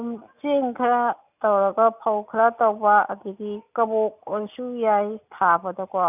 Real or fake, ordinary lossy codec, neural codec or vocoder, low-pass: real; none; none; 3.6 kHz